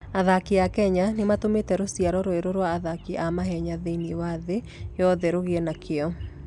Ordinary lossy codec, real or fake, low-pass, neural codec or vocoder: none; real; 10.8 kHz; none